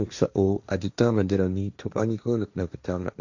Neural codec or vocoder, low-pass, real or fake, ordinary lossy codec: codec, 16 kHz, 1.1 kbps, Voila-Tokenizer; 7.2 kHz; fake; none